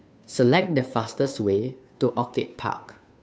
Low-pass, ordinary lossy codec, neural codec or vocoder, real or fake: none; none; codec, 16 kHz, 2 kbps, FunCodec, trained on Chinese and English, 25 frames a second; fake